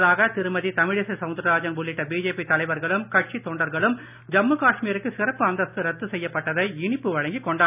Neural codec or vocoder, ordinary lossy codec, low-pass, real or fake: none; none; 3.6 kHz; real